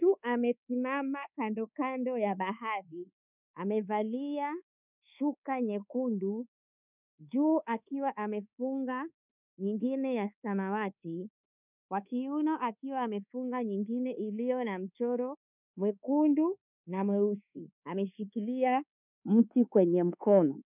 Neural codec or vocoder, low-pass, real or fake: codec, 24 kHz, 1.2 kbps, DualCodec; 3.6 kHz; fake